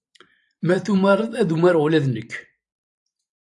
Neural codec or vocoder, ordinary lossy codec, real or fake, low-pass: vocoder, 44.1 kHz, 128 mel bands every 512 samples, BigVGAN v2; AAC, 64 kbps; fake; 10.8 kHz